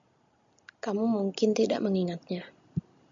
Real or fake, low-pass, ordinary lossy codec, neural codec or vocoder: real; 7.2 kHz; MP3, 64 kbps; none